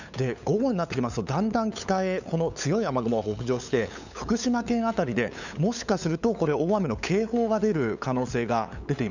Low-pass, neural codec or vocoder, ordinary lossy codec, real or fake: 7.2 kHz; codec, 16 kHz, 8 kbps, FunCodec, trained on LibriTTS, 25 frames a second; none; fake